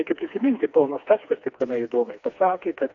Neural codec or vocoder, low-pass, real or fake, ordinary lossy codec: codec, 16 kHz, 2 kbps, FreqCodec, smaller model; 7.2 kHz; fake; AAC, 48 kbps